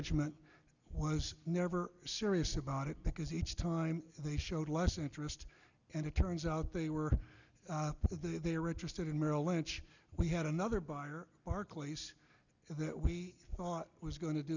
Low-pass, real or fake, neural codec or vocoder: 7.2 kHz; real; none